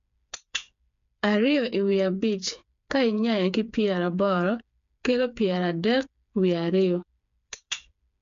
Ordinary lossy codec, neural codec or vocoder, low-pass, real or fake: MP3, 64 kbps; codec, 16 kHz, 4 kbps, FreqCodec, smaller model; 7.2 kHz; fake